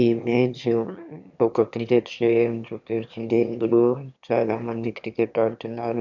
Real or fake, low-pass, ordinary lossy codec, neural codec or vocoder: fake; 7.2 kHz; none; autoencoder, 22.05 kHz, a latent of 192 numbers a frame, VITS, trained on one speaker